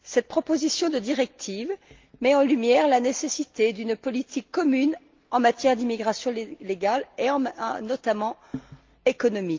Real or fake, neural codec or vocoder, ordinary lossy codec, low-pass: real; none; Opus, 32 kbps; 7.2 kHz